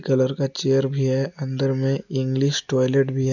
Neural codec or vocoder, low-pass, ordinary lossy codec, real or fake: none; 7.2 kHz; none; real